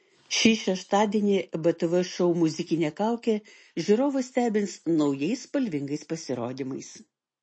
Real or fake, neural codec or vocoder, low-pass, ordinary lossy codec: real; none; 9.9 kHz; MP3, 32 kbps